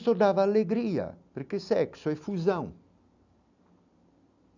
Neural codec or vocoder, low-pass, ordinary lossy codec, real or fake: none; 7.2 kHz; none; real